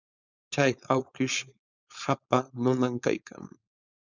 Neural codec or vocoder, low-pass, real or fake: codec, 16 kHz, 4.8 kbps, FACodec; 7.2 kHz; fake